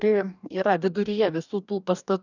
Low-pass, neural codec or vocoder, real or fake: 7.2 kHz; codec, 44.1 kHz, 2.6 kbps, DAC; fake